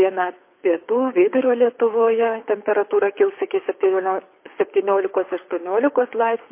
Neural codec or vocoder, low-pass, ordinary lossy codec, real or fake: codec, 24 kHz, 6 kbps, HILCodec; 3.6 kHz; MP3, 24 kbps; fake